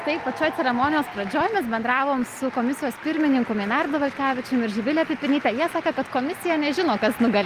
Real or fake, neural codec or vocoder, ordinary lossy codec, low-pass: real; none; Opus, 32 kbps; 14.4 kHz